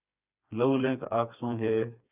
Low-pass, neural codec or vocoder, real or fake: 3.6 kHz; codec, 16 kHz, 4 kbps, FreqCodec, smaller model; fake